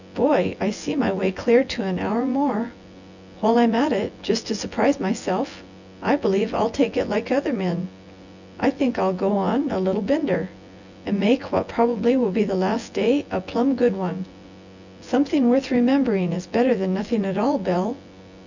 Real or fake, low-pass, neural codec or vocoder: fake; 7.2 kHz; vocoder, 24 kHz, 100 mel bands, Vocos